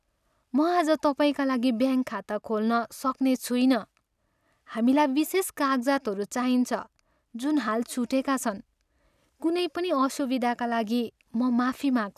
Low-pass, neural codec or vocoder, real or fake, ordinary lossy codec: 14.4 kHz; none; real; none